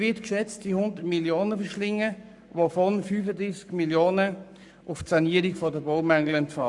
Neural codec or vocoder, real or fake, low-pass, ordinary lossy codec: codec, 44.1 kHz, 7.8 kbps, Pupu-Codec; fake; 10.8 kHz; none